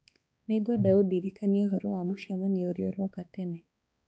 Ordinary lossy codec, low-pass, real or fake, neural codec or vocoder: none; none; fake; codec, 16 kHz, 2 kbps, X-Codec, HuBERT features, trained on balanced general audio